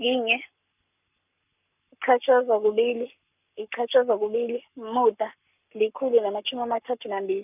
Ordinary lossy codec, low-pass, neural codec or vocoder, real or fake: none; 3.6 kHz; codec, 44.1 kHz, 7.8 kbps, Pupu-Codec; fake